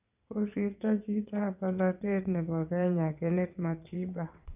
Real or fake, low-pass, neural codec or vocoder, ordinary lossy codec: fake; 3.6 kHz; vocoder, 22.05 kHz, 80 mel bands, WaveNeXt; none